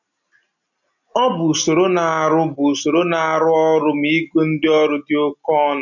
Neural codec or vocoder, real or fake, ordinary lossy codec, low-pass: none; real; none; 7.2 kHz